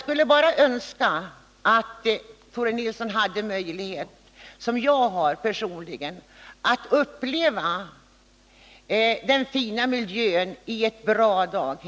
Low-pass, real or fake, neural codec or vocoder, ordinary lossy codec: none; real; none; none